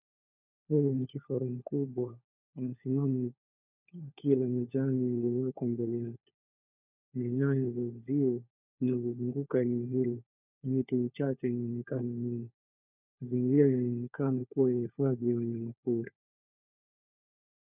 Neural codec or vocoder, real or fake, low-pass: codec, 16 kHz, 4 kbps, FunCodec, trained on LibriTTS, 50 frames a second; fake; 3.6 kHz